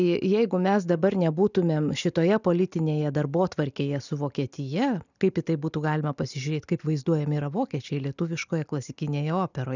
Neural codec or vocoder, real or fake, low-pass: none; real; 7.2 kHz